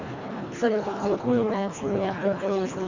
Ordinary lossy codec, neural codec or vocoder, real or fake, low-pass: Opus, 64 kbps; codec, 24 kHz, 1.5 kbps, HILCodec; fake; 7.2 kHz